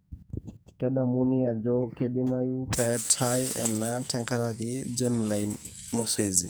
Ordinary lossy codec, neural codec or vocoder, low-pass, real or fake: none; codec, 44.1 kHz, 2.6 kbps, SNAC; none; fake